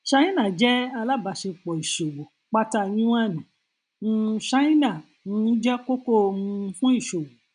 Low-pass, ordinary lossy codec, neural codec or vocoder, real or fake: 9.9 kHz; none; none; real